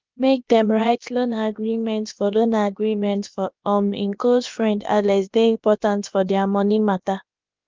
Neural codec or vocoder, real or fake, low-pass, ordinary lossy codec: codec, 16 kHz, about 1 kbps, DyCAST, with the encoder's durations; fake; 7.2 kHz; Opus, 32 kbps